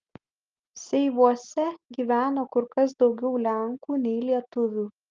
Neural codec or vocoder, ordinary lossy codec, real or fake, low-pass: none; Opus, 24 kbps; real; 7.2 kHz